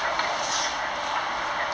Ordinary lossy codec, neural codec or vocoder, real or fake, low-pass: none; none; real; none